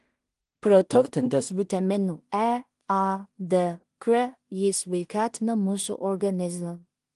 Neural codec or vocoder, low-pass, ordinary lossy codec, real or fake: codec, 16 kHz in and 24 kHz out, 0.4 kbps, LongCat-Audio-Codec, two codebook decoder; 10.8 kHz; Opus, 24 kbps; fake